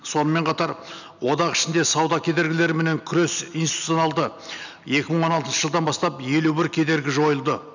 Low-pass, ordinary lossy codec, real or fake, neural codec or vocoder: 7.2 kHz; none; real; none